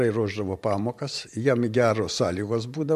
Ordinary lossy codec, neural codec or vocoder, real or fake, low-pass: MP3, 64 kbps; vocoder, 44.1 kHz, 128 mel bands every 512 samples, BigVGAN v2; fake; 14.4 kHz